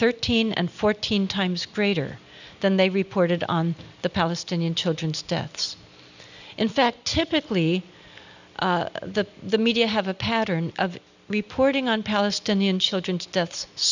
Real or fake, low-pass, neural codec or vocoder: real; 7.2 kHz; none